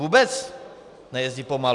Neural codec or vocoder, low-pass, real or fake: none; 10.8 kHz; real